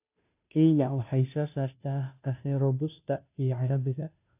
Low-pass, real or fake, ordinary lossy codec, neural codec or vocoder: 3.6 kHz; fake; AAC, 32 kbps; codec, 16 kHz, 0.5 kbps, FunCodec, trained on Chinese and English, 25 frames a second